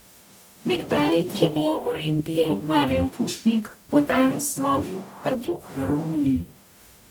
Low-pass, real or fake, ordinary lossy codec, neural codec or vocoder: 19.8 kHz; fake; none; codec, 44.1 kHz, 0.9 kbps, DAC